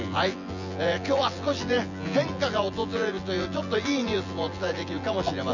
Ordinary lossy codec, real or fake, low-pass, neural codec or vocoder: none; fake; 7.2 kHz; vocoder, 24 kHz, 100 mel bands, Vocos